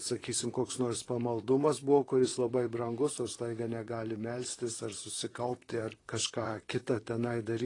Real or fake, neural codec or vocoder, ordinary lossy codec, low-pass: fake; vocoder, 44.1 kHz, 128 mel bands, Pupu-Vocoder; AAC, 32 kbps; 10.8 kHz